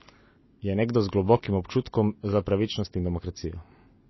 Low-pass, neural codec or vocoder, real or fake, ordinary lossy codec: 7.2 kHz; none; real; MP3, 24 kbps